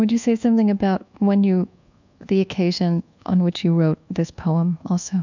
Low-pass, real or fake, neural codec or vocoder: 7.2 kHz; fake; codec, 24 kHz, 1.2 kbps, DualCodec